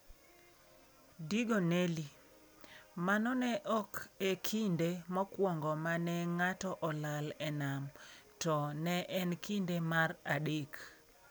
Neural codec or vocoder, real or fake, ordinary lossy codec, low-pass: none; real; none; none